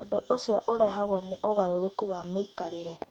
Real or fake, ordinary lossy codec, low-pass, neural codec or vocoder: fake; none; 19.8 kHz; codec, 44.1 kHz, 2.6 kbps, DAC